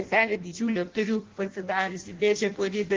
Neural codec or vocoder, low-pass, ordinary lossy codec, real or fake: codec, 16 kHz in and 24 kHz out, 0.6 kbps, FireRedTTS-2 codec; 7.2 kHz; Opus, 16 kbps; fake